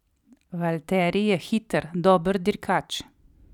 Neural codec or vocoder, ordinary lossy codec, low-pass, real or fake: vocoder, 44.1 kHz, 128 mel bands every 256 samples, BigVGAN v2; none; 19.8 kHz; fake